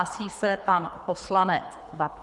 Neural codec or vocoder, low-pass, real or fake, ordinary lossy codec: codec, 24 kHz, 3 kbps, HILCodec; 10.8 kHz; fake; MP3, 96 kbps